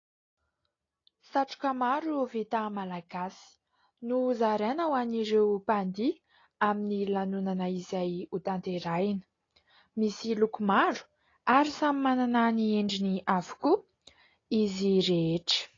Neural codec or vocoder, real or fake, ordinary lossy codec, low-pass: none; real; AAC, 32 kbps; 7.2 kHz